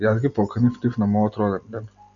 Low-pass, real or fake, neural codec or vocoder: 7.2 kHz; real; none